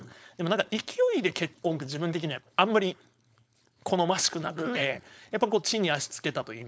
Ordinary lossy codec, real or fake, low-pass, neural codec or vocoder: none; fake; none; codec, 16 kHz, 4.8 kbps, FACodec